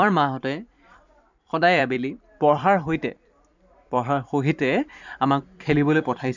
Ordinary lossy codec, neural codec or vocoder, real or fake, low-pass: none; vocoder, 44.1 kHz, 128 mel bands every 256 samples, BigVGAN v2; fake; 7.2 kHz